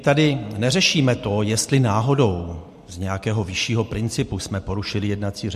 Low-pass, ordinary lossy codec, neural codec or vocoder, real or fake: 14.4 kHz; MP3, 64 kbps; none; real